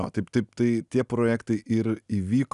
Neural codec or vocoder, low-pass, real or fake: none; 10.8 kHz; real